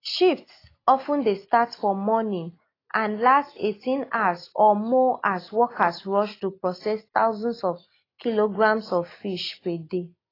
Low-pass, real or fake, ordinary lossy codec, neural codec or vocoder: 5.4 kHz; real; AAC, 24 kbps; none